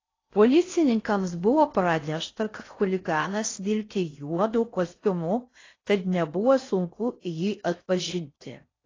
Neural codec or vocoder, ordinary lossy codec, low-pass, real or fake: codec, 16 kHz in and 24 kHz out, 0.6 kbps, FocalCodec, streaming, 4096 codes; AAC, 32 kbps; 7.2 kHz; fake